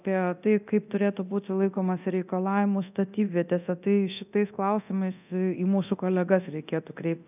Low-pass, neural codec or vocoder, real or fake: 3.6 kHz; codec, 24 kHz, 0.9 kbps, DualCodec; fake